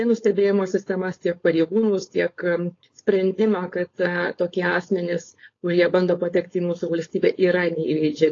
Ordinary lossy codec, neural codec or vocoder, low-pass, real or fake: AAC, 32 kbps; codec, 16 kHz, 4.8 kbps, FACodec; 7.2 kHz; fake